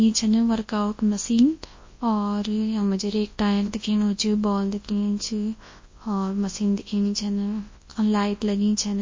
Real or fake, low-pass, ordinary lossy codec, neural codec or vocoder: fake; 7.2 kHz; MP3, 32 kbps; codec, 24 kHz, 0.9 kbps, WavTokenizer, large speech release